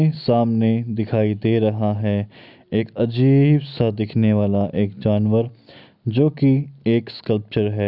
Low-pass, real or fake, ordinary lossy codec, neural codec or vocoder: 5.4 kHz; real; none; none